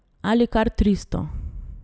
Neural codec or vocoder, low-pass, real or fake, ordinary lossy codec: none; none; real; none